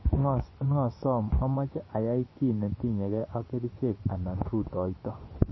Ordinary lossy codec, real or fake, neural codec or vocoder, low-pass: MP3, 24 kbps; fake; vocoder, 24 kHz, 100 mel bands, Vocos; 7.2 kHz